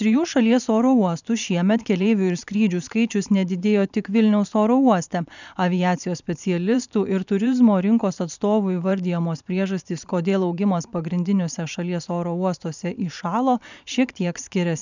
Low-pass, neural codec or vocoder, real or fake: 7.2 kHz; vocoder, 44.1 kHz, 128 mel bands every 512 samples, BigVGAN v2; fake